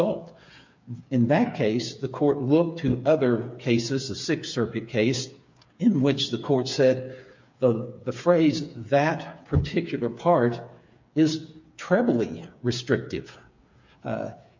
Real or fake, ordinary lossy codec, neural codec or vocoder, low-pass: fake; MP3, 48 kbps; codec, 16 kHz, 8 kbps, FreqCodec, smaller model; 7.2 kHz